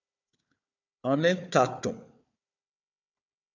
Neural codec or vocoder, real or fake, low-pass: codec, 16 kHz, 4 kbps, FunCodec, trained on Chinese and English, 50 frames a second; fake; 7.2 kHz